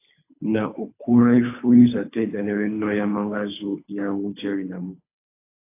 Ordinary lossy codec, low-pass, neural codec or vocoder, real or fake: AAC, 24 kbps; 3.6 kHz; codec, 24 kHz, 3 kbps, HILCodec; fake